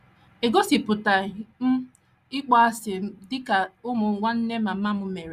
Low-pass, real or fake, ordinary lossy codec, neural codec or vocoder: 14.4 kHz; real; Opus, 64 kbps; none